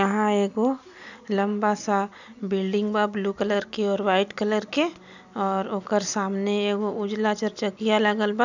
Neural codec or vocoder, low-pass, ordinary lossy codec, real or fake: none; 7.2 kHz; none; real